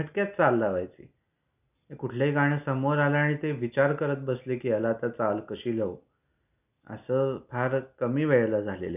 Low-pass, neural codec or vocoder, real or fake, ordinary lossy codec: 3.6 kHz; none; real; none